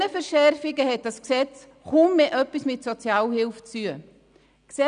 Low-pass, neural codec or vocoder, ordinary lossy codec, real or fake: 9.9 kHz; none; none; real